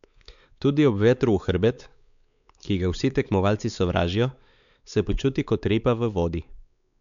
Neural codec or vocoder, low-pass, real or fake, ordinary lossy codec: codec, 16 kHz, 4 kbps, X-Codec, WavLM features, trained on Multilingual LibriSpeech; 7.2 kHz; fake; none